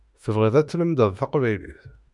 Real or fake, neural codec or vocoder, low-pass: fake; autoencoder, 48 kHz, 32 numbers a frame, DAC-VAE, trained on Japanese speech; 10.8 kHz